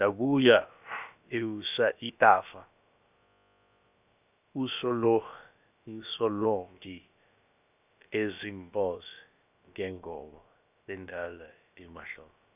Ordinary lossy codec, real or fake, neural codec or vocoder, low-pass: none; fake; codec, 16 kHz, about 1 kbps, DyCAST, with the encoder's durations; 3.6 kHz